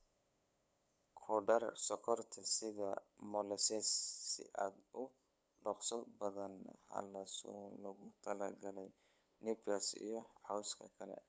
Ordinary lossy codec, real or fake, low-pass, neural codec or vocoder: none; fake; none; codec, 16 kHz, 8 kbps, FunCodec, trained on LibriTTS, 25 frames a second